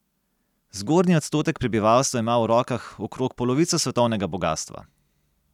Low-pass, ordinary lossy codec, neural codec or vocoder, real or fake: 19.8 kHz; none; none; real